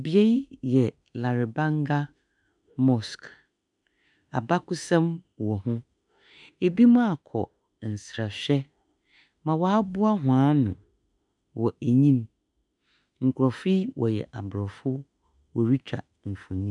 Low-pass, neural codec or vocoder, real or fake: 10.8 kHz; autoencoder, 48 kHz, 32 numbers a frame, DAC-VAE, trained on Japanese speech; fake